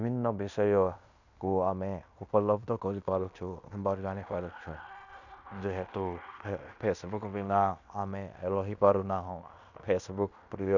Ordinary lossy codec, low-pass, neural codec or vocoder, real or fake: none; 7.2 kHz; codec, 16 kHz in and 24 kHz out, 0.9 kbps, LongCat-Audio-Codec, fine tuned four codebook decoder; fake